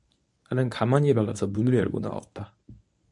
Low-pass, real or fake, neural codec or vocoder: 10.8 kHz; fake; codec, 24 kHz, 0.9 kbps, WavTokenizer, medium speech release version 1